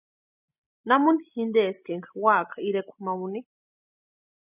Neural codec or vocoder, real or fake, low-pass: none; real; 3.6 kHz